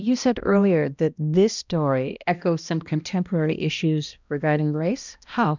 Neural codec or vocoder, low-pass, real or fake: codec, 16 kHz, 1 kbps, X-Codec, HuBERT features, trained on balanced general audio; 7.2 kHz; fake